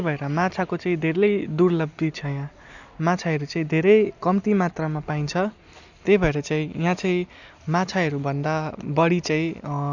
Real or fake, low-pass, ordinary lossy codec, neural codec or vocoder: real; 7.2 kHz; none; none